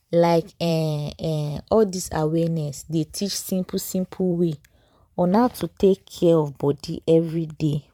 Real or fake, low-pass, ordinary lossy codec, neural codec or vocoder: fake; 19.8 kHz; MP3, 96 kbps; vocoder, 44.1 kHz, 128 mel bands every 512 samples, BigVGAN v2